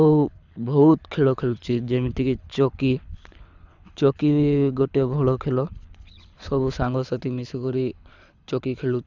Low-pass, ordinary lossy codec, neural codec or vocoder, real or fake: 7.2 kHz; none; codec, 24 kHz, 6 kbps, HILCodec; fake